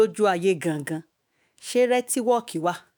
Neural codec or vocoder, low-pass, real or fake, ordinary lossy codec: autoencoder, 48 kHz, 128 numbers a frame, DAC-VAE, trained on Japanese speech; none; fake; none